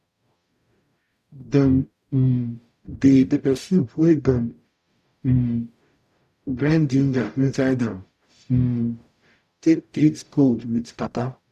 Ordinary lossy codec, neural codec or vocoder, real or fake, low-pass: none; codec, 44.1 kHz, 0.9 kbps, DAC; fake; 14.4 kHz